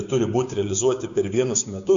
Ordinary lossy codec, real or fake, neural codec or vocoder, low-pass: MP3, 64 kbps; fake; codec, 16 kHz, 6 kbps, DAC; 7.2 kHz